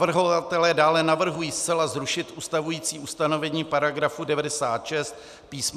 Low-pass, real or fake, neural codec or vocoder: 14.4 kHz; real; none